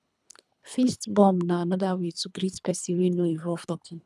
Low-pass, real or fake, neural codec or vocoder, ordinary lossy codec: none; fake; codec, 24 kHz, 3 kbps, HILCodec; none